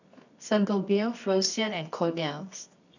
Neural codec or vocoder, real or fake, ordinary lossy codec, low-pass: codec, 24 kHz, 0.9 kbps, WavTokenizer, medium music audio release; fake; none; 7.2 kHz